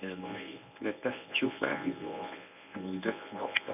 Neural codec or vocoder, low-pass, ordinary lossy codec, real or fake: codec, 24 kHz, 0.9 kbps, WavTokenizer, medium speech release version 1; 3.6 kHz; none; fake